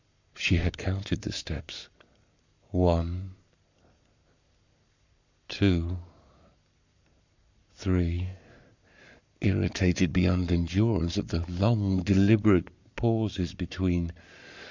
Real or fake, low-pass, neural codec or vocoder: fake; 7.2 kHz; codec, 44.1 kHz, 7.8 kbps, Pupu-Codec